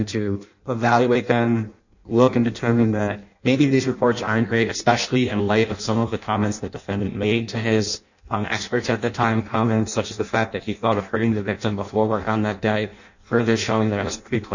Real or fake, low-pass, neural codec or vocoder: fake; 7.2 kHz; codec, 16 kHz in and 24 kHz out, 0.6 kbps, FireRedTTS-2 codec